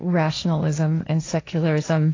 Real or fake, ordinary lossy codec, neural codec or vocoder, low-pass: fake; AAC, 32 kbps; codec, 16 kHz, 1.1 kbps, Voila-Tokenizer; 7.2 kHz